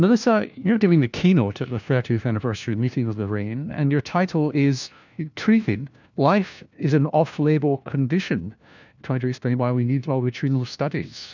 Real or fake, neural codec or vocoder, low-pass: fake; codec, 16 kHz, 1 kbps, FunCodec, trained on LibriTTS, 50 frames a second; 7.2 kHz